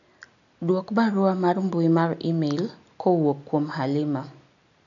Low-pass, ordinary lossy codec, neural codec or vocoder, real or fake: 7.2 kHz; none; none; real